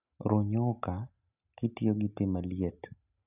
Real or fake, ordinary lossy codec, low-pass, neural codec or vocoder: real; none; 3.6 kHz; none